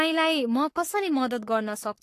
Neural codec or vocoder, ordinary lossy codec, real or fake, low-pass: codec, 44.1 kHz, 3.4 kbps, Pupu-Codec; AAC, 48 kbps; fake; 14.4 kHz